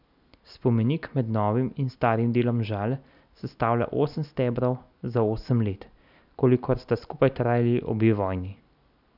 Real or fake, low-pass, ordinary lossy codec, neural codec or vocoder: real; 5.4 kHz; none; none